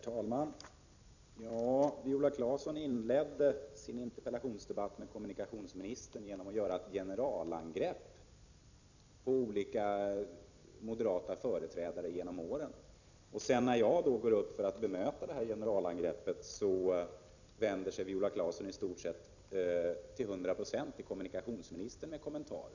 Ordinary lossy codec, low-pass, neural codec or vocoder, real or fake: none; 7.2 kHz; vocoder, 44.1 kHz, 128 mel bands every 256 samples, BigVGAN v2; fake